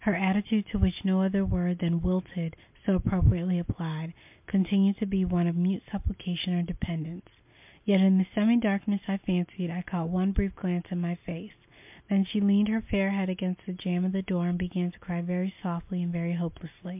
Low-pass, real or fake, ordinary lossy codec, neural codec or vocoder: 3.6 kHz; real; MP3, 32 kbps; none